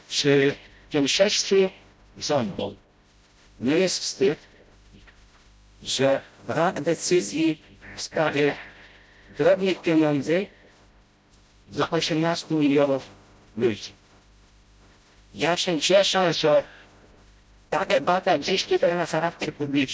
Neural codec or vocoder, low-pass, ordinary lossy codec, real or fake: codec, 16 kHz, 0.5 kbps, FreqCodec, smaller model; none; none; fake